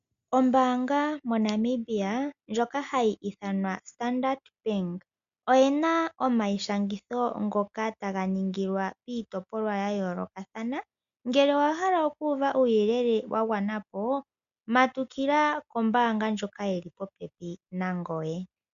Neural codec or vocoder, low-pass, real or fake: none; 7.2 kHz; real